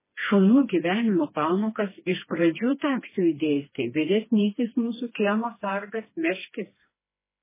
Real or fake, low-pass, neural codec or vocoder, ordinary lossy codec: fake; 3.6 kHz; codec, 16 kHz, 2 kbps, FreqCodec, smaller model; MP3, 16 kbps